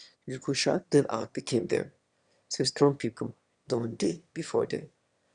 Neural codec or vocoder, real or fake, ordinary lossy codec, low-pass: autoencoder, 22.05 kHz, a latent of 192 numbers a frame, VITS, trained on one speaker; fake; Opus, 64 kbps; 9.9 kHz